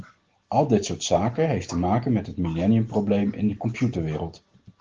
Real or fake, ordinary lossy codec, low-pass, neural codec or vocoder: real; Opus, 16 kbps; 7.2 kHz; none